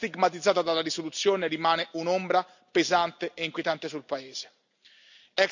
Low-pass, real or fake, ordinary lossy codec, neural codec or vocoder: 7.2 kHz; real; none; none